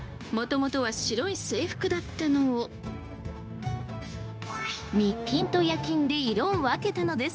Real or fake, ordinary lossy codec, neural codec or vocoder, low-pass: fake; none; codec, 16 kHz, 0.9 kbps, LongCat-Audio-Codec; none